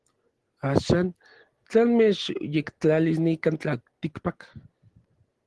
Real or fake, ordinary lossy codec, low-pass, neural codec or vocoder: real; Opus, 16 kbps; 10.8 kHz; none